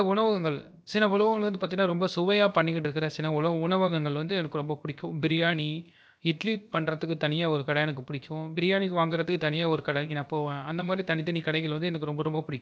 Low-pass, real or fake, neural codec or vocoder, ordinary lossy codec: none; fake; codec, 16 kHz, about 1 kbps, DyCAST, with the encoder's durations; none